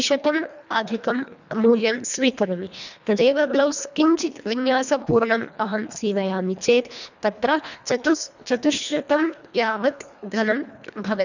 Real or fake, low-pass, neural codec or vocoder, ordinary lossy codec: fake; 7.2 kHz; codec, 24 kHz, 1.5 kbps, HILCodec; none